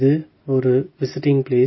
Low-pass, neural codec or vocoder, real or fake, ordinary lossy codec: 7.2 kHz; none; real; MP3, 24 kbps